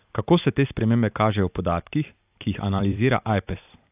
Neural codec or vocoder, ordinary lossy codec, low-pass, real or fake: vocoder, 44.1 kHz, 128 mel bands every 256 samples, BigVGAN v2; AAC, 32 kbps; 3.6 kHz; fake